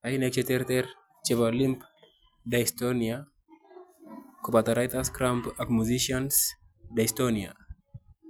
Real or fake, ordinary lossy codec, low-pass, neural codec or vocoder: real; none; none; none